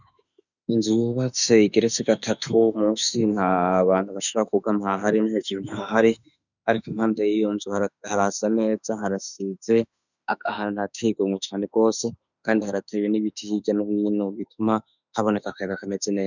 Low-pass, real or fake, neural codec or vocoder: 7.2 kHz; fake; autoencoder, 48 kHz, 32 numbers a frame, DAC-VAE, trained on Japanese speech